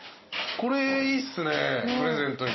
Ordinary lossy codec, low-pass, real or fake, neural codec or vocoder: MP3, 24 kbps; 7.2 kHz; real; none